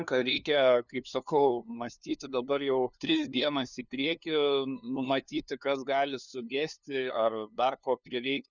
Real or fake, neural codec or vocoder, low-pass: fake; codec, 16 kHz, 2 kbps, FunCodec, trained on LibriTTS, 25 frames a second; 7.2 kHz